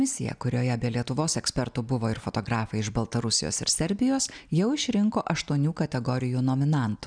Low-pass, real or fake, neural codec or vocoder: 9.9 kHz; real; none